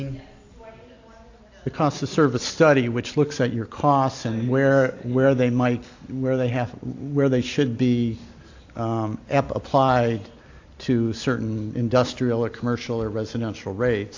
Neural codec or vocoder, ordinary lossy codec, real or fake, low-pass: none; AAC, 48 kbps; real; 7.2 kHz